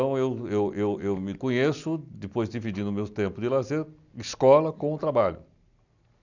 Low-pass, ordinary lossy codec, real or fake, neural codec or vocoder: 7.2 kHz; none; real; none